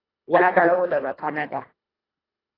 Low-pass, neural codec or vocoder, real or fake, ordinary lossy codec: 5.4 kHz; codec, 24 kHz, 1.5 kbps, HILCodec; fake; AAC, 24 kbps